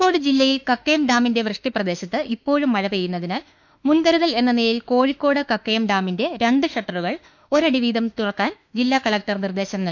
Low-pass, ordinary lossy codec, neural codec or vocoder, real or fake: 7.2 kHz; none; autoencoder, 48 kHz, 32 numbers a frame, DAC-VAE, trained on Japanese speech; fake